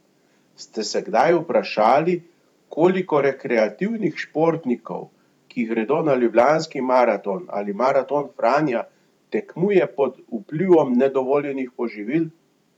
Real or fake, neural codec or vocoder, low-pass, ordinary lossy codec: fake; vocoder, 44.1 kHz, 128 mel bands every 512 samples, BigVGAN v2; 19.8 kHz; none